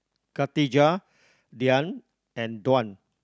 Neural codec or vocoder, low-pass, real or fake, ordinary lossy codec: none; none; real; none